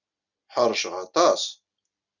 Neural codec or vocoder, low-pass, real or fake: none; 7.2 kHz; real